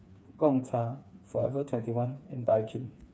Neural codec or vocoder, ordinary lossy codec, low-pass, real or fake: codec, 16 kHz, 4 kbps, FreqCodec, smaller model; none; none; fake